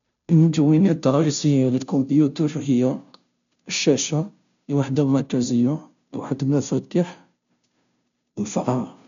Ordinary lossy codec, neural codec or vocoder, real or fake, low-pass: MP3, 64 kbps; codec, 16 kHz, 0.5 kbps, FunCodec, trained on Chinese and English, 25 frames a second; fake; 7.2 kHz